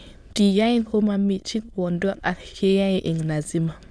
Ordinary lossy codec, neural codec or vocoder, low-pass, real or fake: none; autoencoder, 22.05 kHz, a latent of 192 numbers a frame, VITS, trained on many speakers; none; fake